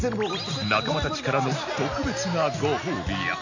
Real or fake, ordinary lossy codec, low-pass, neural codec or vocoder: real; none; 7.2 kHz; none